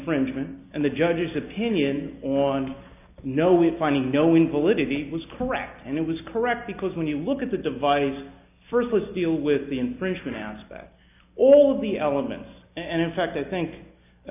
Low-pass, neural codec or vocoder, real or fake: 3.6 kHz; none; real